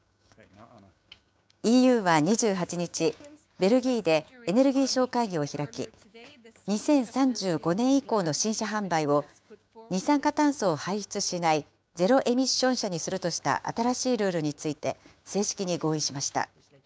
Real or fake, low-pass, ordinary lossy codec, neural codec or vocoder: fake; none; none; codec, 16 kHz, 6 kbps, DAC